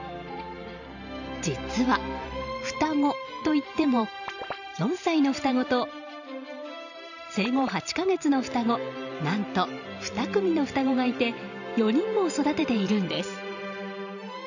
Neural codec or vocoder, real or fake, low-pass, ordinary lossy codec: vocoder, 44.1 kHz, 128 mel bands every 512 samples, BigVGAN v2; fake; 7.2 kHz; none